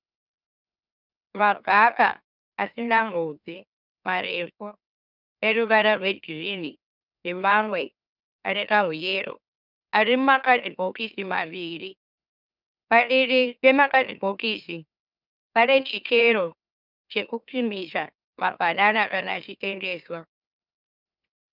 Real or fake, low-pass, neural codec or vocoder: fake; 5.4 kHz; autoencoder, 44.1 kHz, a latent of 192 numbers a frame, MeloTTS